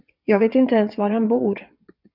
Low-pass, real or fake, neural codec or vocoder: 5.4 kHz; fake; vocoder, 22.05 kHz, 80 mel bands, WaveNeXt